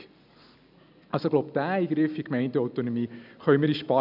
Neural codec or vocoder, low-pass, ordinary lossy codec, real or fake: none; 5.4 kHz; none; real